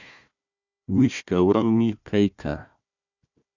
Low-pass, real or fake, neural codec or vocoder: 7.2 kHz; fake; codec, 16 kHz, 1 kbps, FunCodec, trained on Chinese and English, 50 frames a second